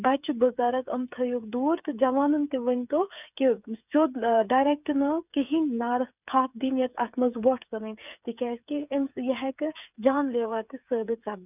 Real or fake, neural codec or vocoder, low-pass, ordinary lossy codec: fake; codec, 16 kHz, 8 kbps, FreqCodec, smaller model; 3.6 kHz; none